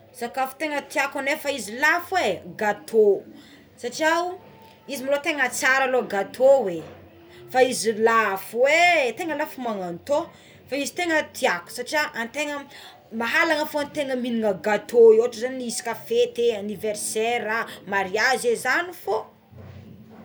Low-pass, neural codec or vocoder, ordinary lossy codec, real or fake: none; none; none; real